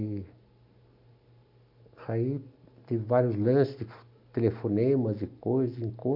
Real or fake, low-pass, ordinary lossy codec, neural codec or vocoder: real; 5.4 kHz; MP3, 48 kbps; none